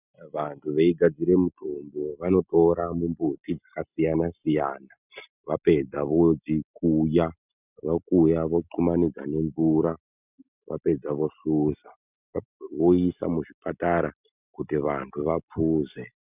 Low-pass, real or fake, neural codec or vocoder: 3.6 kHz; real; none